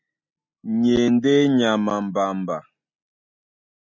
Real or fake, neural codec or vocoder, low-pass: real; none; 7.2 kHz